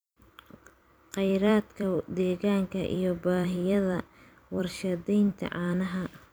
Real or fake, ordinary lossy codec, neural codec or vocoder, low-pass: fake; none; vocoder, 44.1 kHz, 128 mel bands every 256 samples, BigVGAN v2; none